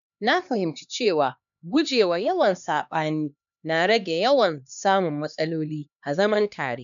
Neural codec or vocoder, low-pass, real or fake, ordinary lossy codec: codec, 16 kHz, 2 kbps, X-Codec, HuBERT features, trained on LibriSpeech; 7.2 kHz; fake; none